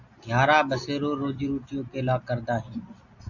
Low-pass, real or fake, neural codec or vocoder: 7.2 kHz; real; none